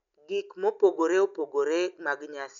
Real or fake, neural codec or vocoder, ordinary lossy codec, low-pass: real; none; none; 7.2 kHz